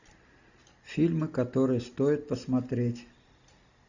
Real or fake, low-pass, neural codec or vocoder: real; 7.2 kHz; none